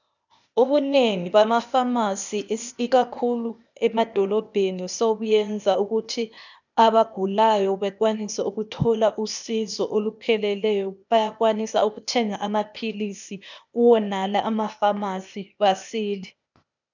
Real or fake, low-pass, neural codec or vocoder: fake; 7.2 kHz; codec, 16 kHz, 0.8 kbps, ZipCodec